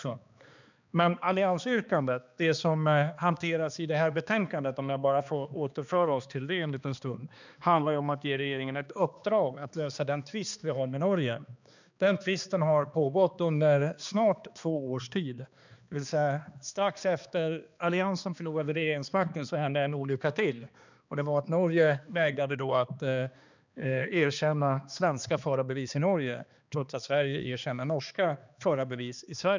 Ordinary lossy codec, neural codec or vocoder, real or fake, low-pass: none; codec, 16 kHz, 2 kbps, X-Codec, HuBERT features, trained on balanced general audio; fake; 7.2 kHz